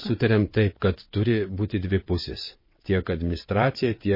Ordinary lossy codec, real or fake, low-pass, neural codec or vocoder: MP3, 24 kbps; fake; 5.4 kHz; vocoder, 22.05 kHz, 80 mel bands, WaveNeXt